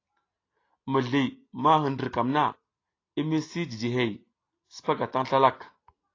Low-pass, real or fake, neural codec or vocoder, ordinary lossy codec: 7.2 kHz; real; none; AAC, 32 kbps